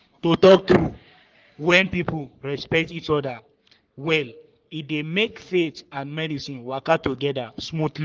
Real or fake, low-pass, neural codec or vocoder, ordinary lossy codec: fake; 7.2 kHz; codec, 44.1 kHz, 3.4 kbps, Pupu-Codec; Opus, 32 kbps